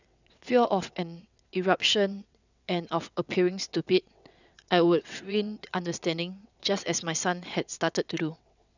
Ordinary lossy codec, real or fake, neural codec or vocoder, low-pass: none; fake; vocoder, 22.05 kHz, 80 mel bands, WaveNeXt; 7.2 kHz